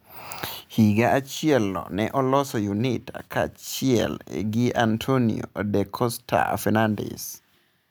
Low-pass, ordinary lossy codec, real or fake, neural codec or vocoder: none; none; real; none